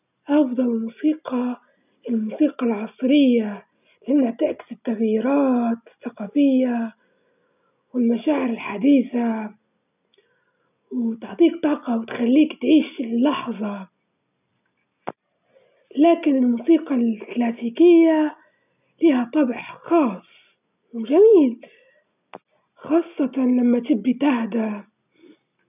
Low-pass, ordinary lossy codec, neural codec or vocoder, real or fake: 3.6 kHz; none; none; real